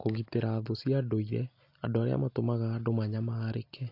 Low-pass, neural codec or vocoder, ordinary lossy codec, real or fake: 5.4 kHz; none; AAC, 32 kbps; real